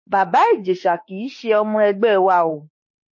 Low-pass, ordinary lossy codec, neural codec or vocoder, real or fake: 7.2 kHz; MP3, 32 kbps; autoencoder, 48 kHz, 32 numbers a frame, DAC-VAE, trained on Japanese speech; fake